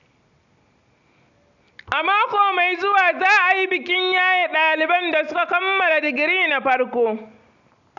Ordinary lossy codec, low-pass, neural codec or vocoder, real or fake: none; 7.2 kHz; none; real